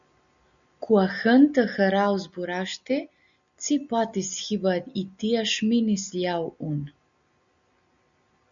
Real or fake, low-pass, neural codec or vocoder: real; 7.2 kHz; none